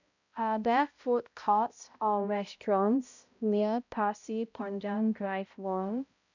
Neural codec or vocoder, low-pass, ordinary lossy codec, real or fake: codec, 16 kHz, 0.5 kbps, X-Codec, HuBERT features, trained on balanced general audio; 7.2 kHz; none; fake